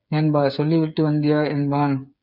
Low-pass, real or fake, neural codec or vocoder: 5.4 kHz; fake; codec, 16 kHz, 8 kbps, FreqCodec, smaller model